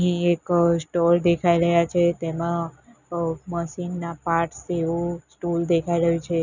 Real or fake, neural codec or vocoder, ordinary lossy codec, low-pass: real; none; none; 7.2 kHz